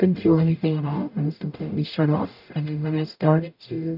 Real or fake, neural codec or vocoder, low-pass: fake; codec, 44.1 kHz, 0.9 kbps, DAC; 5.4 kHz